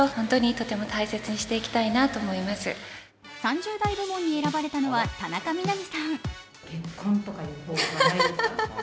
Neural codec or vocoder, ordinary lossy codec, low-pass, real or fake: none; none; none; real